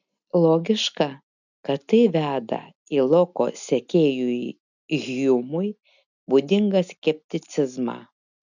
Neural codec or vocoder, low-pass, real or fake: none; 7.2 kHz; real